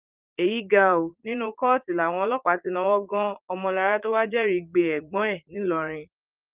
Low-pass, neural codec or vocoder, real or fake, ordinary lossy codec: 3.6 kHz; vocoder, 44.1 kHz, 80 mel bands, Vocos; fake; Opus, 24 kbps